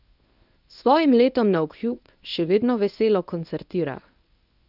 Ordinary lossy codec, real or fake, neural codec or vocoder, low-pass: none; fake; codec, 24 kHz, 0.9 kbps, WavTokenizer, medium speech release version 1; 5.4 kHz